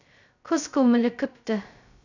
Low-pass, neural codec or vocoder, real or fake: 7.2 kHz; codec, 16 kHz, 0.2 kbps, FocalCodec; fake